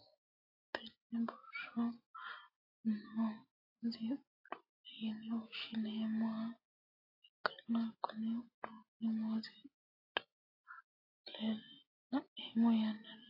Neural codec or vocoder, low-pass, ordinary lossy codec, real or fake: none; 5.4 kHz; AAC, 24 kbps; real